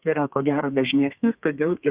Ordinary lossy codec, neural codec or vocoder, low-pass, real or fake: Opus, 64 kbps; codec, 32 kHz, 1.9 kbps, SNAC; 3.6 kHz; fake